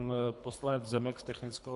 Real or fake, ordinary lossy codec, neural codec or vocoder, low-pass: fake; MP3, 96 kbps; codec, 24 kHz, 3 kbps, HILCodec; 10.8 kHz